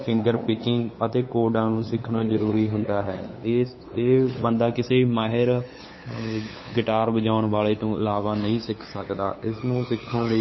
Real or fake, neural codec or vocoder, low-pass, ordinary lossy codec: fake; codec, 16 kHz, 8 kbps, FunCodec, trained on LibriTTS, 25 frames a second; 7.2 kHz; MP3, 24 kbps